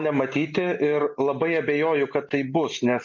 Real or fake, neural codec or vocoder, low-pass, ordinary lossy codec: real; none; 7.2 kHz; MP3, 64 kbps